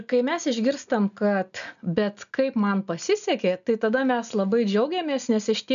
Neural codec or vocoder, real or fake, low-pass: none; real; 7.2 kHz